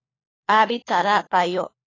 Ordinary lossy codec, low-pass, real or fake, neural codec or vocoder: AAC, 32 kbps; 7.2 kHz; fake; codec, 16 kHz, 4 kbps, FunCodec, trained on LibriTTS, 50 frames a second